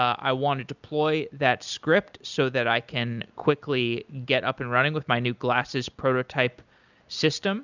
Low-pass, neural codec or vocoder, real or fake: 7.2 kHz; none; real